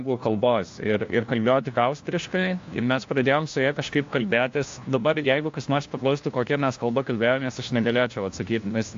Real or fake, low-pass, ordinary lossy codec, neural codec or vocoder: fake; 7.2 kHz; AAC, 48 kbps; codec, 16 kHz, 1 kbps, FunCodec, trained on LibriTTS, 50 frames a second